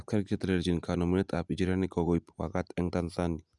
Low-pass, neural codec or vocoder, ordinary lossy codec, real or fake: 10.8 kHz; none; none; real